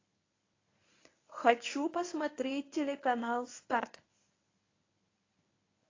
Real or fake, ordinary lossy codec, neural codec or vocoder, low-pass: fake; AAC, 32 kbps; codec, 24 kHz, 0.9 kbps, WavTokenizer, medium speech release version 1; 7.2 kHz